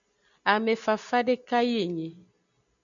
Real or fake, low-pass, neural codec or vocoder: real; 7.2 kHz; none